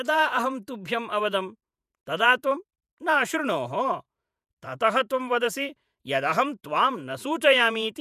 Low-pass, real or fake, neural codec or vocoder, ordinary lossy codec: 14.4 kHz; fake; vocoder, 48 kHz, 128 mel bands, Vocos; none